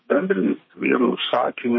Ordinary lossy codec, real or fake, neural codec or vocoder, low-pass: MP3, 24 kbps; fake; codec, 32 kHz, 1.9 kbps, SNAC; 7.2 kHz